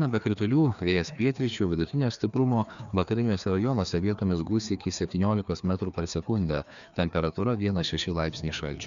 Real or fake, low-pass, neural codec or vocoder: fake; 7.2 kHz; codec, 16 kHz, 2 kbps, FreqCodec, larger model